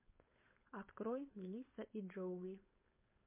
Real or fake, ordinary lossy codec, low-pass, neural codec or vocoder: fake; MP3, 24 kbps; 3.6 kHz; codec, 16 kHz, 4.8 kbps, FACodec